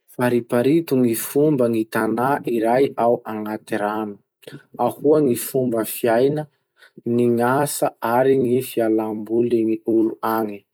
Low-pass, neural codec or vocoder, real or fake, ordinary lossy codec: none; vocoder, 44.1 kHz, 128 mel bands every 256 samples, BigVGAN v2; fake; none